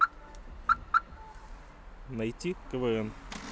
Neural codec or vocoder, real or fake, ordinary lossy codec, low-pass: none; real; none; none